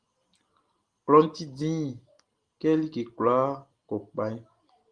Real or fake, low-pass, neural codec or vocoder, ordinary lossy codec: real; 9.9 kHz; none; Opus, 24 kbps